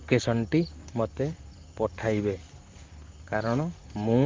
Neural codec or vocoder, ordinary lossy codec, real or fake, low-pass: vocoder, 44.1 kHz, 128 mel bands, Pupu-Vocoder; Opus, 32 kbps; fake; 7.2 kHz